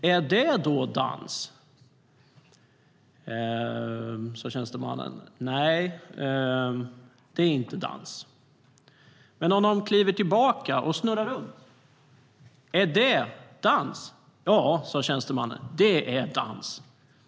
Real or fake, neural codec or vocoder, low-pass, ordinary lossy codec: real; none; none; none